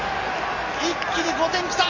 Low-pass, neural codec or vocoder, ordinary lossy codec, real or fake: 7.2 kHz; none; AAC, 32 kbps; real